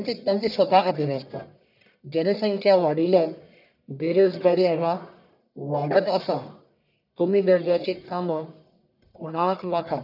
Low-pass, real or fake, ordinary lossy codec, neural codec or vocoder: 5.4 kHz; fake; none; codec, 44.1 kHz, 1.7 kbps, Pupu-Codec